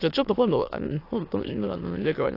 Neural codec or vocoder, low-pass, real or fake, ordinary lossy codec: autoencoder, 22.05 kHz, a latent of 192 numbers a frame, VITS, trained on many speakers; 5.4 kHz; fake; AAC, 32 kbps